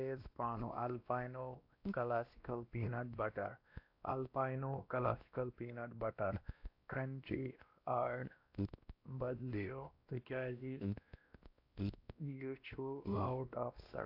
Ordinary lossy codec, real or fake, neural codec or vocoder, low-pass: AAC, 48 kbps; fake; codec, 16 kHz, 1 kbps, X-Codec, WavLM features, trained on Multilingual LibriSpeech; 5.4 kHz